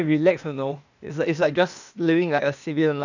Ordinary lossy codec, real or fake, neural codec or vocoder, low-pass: none; fake; codec, 16 kHz, 0.8 kbps, ZipCodec; 7.2 kHz